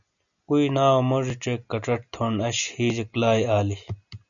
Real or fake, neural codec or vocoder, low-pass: real; none; 7.2 kHz